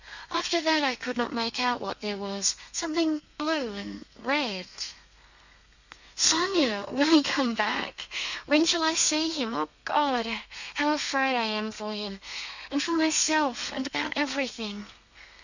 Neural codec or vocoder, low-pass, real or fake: codec, 32 kHz, 1.9 kbps, SNAC; 7.2 kHz; fake